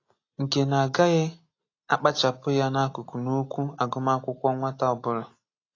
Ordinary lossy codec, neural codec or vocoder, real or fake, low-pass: AAC, 32 kbps; none; real; 7.2 kHz